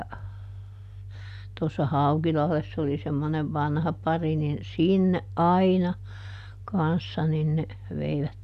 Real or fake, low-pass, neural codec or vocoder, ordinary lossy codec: real; 14.4 kHz; none; none